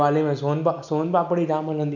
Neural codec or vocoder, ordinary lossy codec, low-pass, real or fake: none; none; 7.2 kHz; real